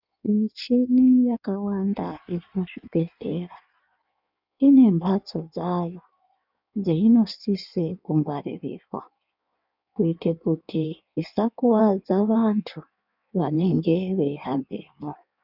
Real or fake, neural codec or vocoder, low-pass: fake; codec, 16 kHz in and 24 kHz out, 1.1 kbps, FireRedTTS-2 codec; 5.4 kHz